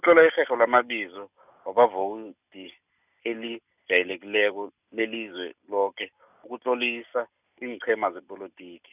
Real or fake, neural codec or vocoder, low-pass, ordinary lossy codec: real; none; 3.6 kHz; none